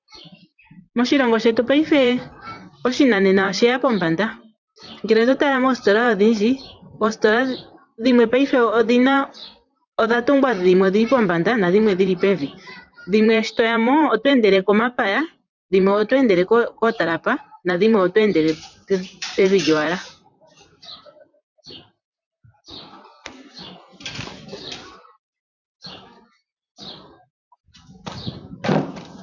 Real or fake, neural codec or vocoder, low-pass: fake; vocoder, 44.1 kHz, 128 mel bands, Pupu-Vocoder; 7.2 kHz